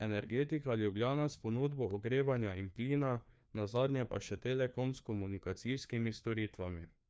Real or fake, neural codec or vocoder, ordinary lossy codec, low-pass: fake; codec, 16 kHz, 2 kbps, FreqCodec, larger model; none; none